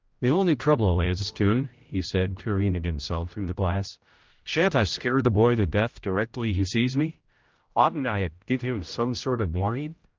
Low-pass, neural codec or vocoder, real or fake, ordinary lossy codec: 7.2 kHz; codec, 16 kHz, 0.5 kbps, X-Codec, HuBERT features, trained on general audio; fake; Opus, 32 kbps